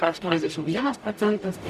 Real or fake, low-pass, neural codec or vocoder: fake; 14.4 kHz; codec, 44.1 kHz, 0.9 kbps, DAC